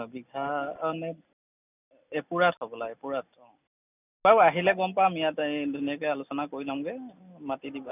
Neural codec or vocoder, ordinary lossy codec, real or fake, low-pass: none; none; real; 3.6 kHz